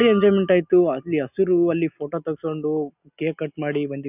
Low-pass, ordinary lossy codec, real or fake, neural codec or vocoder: 3.6 kHz; none; real; none